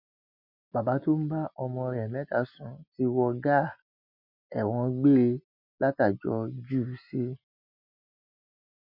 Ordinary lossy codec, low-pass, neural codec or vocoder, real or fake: none; 5.4 kHz; none; real